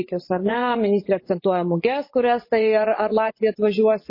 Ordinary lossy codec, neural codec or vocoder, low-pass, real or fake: MP3, 24 kbps; vocoder, 24 kHz, 100 mel bands, Vocos; 5.4 kHz; fake